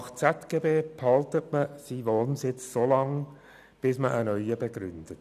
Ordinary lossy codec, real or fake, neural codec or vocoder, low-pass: none; real; none; 14.4 kHz